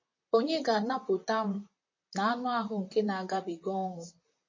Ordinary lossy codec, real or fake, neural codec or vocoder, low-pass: MP3, 32 kbps; fake; vocoder, 44.1 kHz, 128 mel bands, Pupu-Vocoder; 7.2 kHz